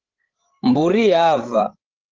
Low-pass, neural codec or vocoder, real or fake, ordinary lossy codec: 7.2 kHz; codec, 16 kHz, 16 kbps, FreqCodec, larger model; fake; Opus, 16 kbps